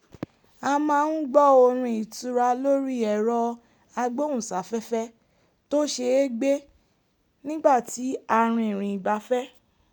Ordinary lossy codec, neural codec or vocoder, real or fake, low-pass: none; none; real; 19.8 kHz